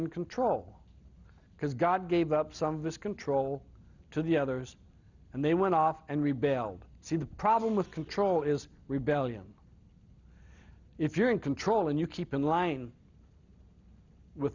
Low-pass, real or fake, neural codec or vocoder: 7.2 kHz; real; none